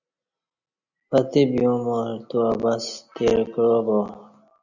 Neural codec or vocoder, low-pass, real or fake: none; 7.2 kHz; real